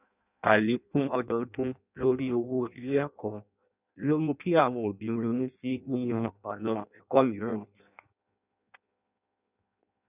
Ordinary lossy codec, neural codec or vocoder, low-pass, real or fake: none; codec, 16 kHz in and 24 kHz out, 0.6 kbps, FireRedTTS-2 codec; 3.6 kHz; fake